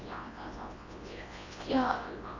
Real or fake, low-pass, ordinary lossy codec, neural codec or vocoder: fake; 7.2 kHz; AAC, 32 kbps; codec, 24 kHz, 0.9 kbps, WavTokenizer, large speech release